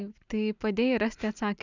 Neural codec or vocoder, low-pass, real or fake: none; 7.2 kHz; real